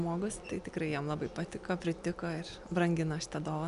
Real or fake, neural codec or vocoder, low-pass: real; none; 10.8 kHz